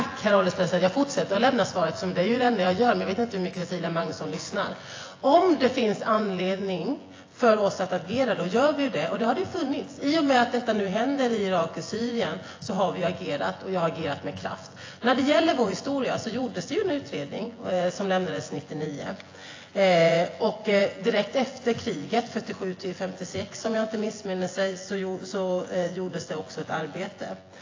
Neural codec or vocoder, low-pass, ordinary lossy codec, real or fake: vocoder, 24 kHz, 100 mel bands, Vocos; 7.2 kHz; AAC, 32 kbps; fake